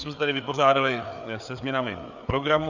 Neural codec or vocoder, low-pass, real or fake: codec, 16 kHz, 4 kbps, FreqCodec, larger model; 7.2 kHz; fake